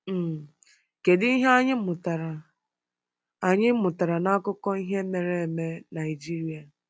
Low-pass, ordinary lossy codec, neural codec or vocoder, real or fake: none; none; none; real